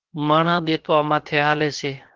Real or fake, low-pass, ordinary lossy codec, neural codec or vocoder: fake; 7.2 kHz; Opus, 16 kbps; codec, 16 kHz, 0.7 kbps, FocalCodec